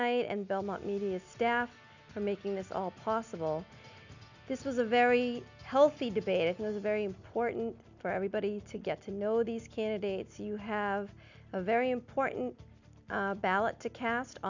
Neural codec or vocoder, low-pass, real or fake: none; 7.2 kHz; real